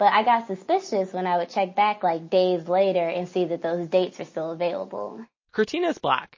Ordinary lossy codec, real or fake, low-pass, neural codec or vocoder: MP3, 32 kbps; real; 7.2 kHz; none